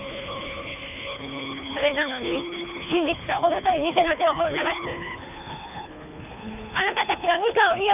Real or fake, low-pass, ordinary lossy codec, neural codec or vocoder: fake; 3.6 kHz; none; codec, 24 kHz, 3 kbps, HILCodec